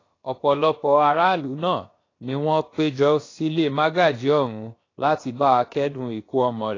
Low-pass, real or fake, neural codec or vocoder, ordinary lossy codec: 7.2 kHz; fake; codec, 16 kHz, about 1 kbps, DyCAST, with the encoder's durations; AAC, 32 kbps